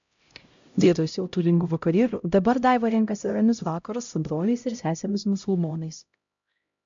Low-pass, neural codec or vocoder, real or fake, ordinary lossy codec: 7.2 kHz; codec, 16 kHz, 0.5 kbps, X-Codec, HuBERT features, trained on LibriSpeech; fake; MP3, 64 kbps